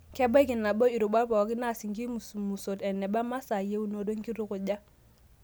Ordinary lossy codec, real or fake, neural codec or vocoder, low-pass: none; real; none; none